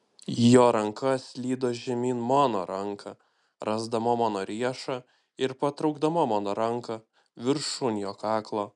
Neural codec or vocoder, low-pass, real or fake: none; 10.8 kHz; real